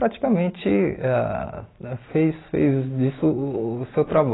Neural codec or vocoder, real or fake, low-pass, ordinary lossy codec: none; real; 7.2 kHz; AAC, 16 kbps